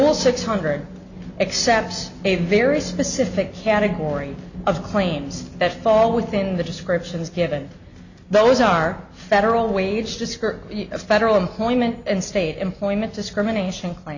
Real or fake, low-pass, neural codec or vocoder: real; 7.2 kHz; none